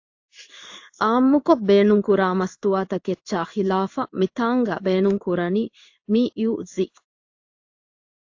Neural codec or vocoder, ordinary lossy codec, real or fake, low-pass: codec, 16 kHz in and 24 kHz out, 1 kbps, XY-Tokenizer; AAC, 48 kbps; fake; 7.2 kHz